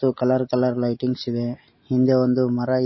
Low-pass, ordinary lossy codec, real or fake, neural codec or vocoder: 7.2 kHz; MP3, 24 kbps; real; none